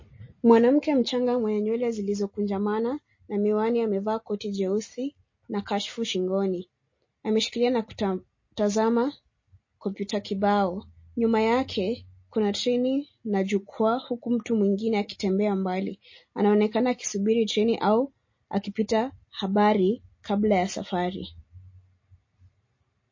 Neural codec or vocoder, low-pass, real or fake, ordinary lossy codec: none; 7.2 kHz; real; MP3, 32 kbps